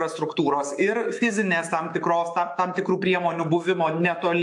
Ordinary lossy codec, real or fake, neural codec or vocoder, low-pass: AAC, 64 kbps; fake; autoencoder, 48 kHz, 128 numbers a frame, DAC-VAE, trained on Japanese speech; 10.8 kHz